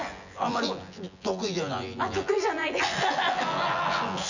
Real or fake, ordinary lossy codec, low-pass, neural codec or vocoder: fake; none; 7.2 kHz; vocoder, 24 kHz, 100 mel bands, Vocos